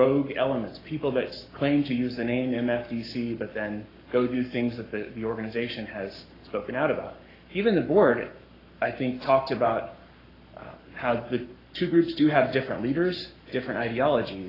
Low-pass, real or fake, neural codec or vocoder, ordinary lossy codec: 5.4 kHz; fake; codec, 44.1 kHz, 7.8 kbps, Pupu-Codec; AAC, 24 kbps